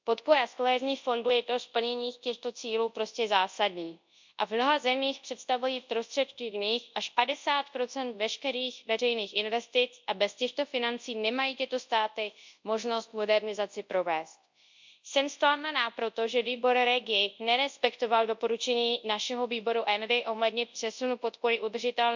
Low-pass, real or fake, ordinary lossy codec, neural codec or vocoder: 7.2 kHz; fake; MP3, 64 kbps; codec, 24 kHz, 0.9 kbps, WavTokenizer, large speech release